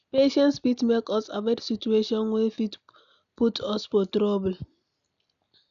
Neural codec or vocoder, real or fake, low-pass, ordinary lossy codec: none; real; 7.2 kHz; none